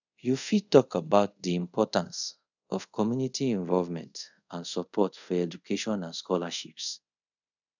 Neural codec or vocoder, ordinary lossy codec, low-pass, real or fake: codec, 24 kHz, 0.5 kbps, DualCodec; none; 7.2 kHz; fake